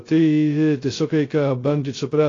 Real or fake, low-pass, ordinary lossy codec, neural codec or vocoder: fake; 7.2 kHz; AAC, 32 kbps; codec, 16 kHz, 0.2 kbps, FocalCodec